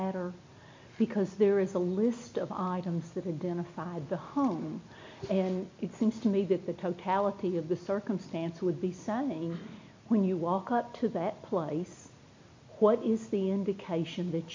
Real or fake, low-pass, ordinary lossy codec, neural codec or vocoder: real; 7.2 kHz; MP3, 64 kbps; none